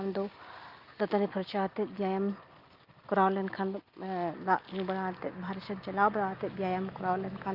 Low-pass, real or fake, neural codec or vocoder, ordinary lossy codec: 5.4 kHz; real; none; Opus, 32 kbps